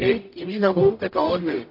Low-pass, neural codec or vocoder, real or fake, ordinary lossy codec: 5.4 kHz; codec, 44.1 kHz, 0.9 kbps, DAC; fake; MP3, 32 kbps